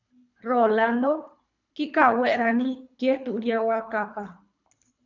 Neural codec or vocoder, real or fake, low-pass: codec, 24 kHz, 3 kbps, HILCodec; fake; 7.2 kHz